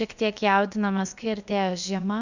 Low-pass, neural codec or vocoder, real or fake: 7.2 kHz; codec, 16 kHz, about 1 kbps, DyCAST, with the encoder's durations; fake